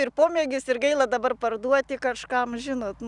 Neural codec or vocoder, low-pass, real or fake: none; 10.8 kHz; real